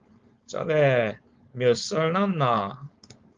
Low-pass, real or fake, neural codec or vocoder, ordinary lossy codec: 7.2 kHz; fake; codec, 16 kHz, 4.8 kbps, FACodec; Opus, 32 kbps